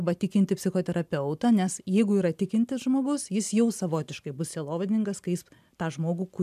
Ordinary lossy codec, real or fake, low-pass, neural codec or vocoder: MP3, 96 kbps; real; 14.4 kHz; none